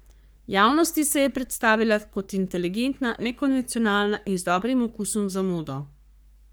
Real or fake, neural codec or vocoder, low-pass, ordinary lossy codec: fake; codec, 44.1 kHz, 3.4 kbps, Pupu-Codec; none; none